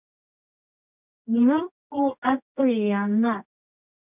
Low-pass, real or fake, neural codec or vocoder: 3.6 kHz; fake; codec, 24 kHz, 0.9 kbps, WavTokenizer, medium music audio release